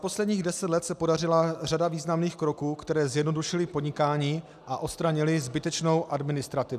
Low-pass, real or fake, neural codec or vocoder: 14.4 kHz; real; none